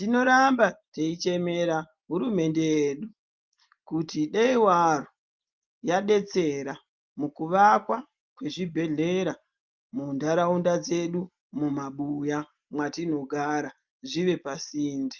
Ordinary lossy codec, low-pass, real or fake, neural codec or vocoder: Opus, 24 kbps; 7.2 kHz; real; none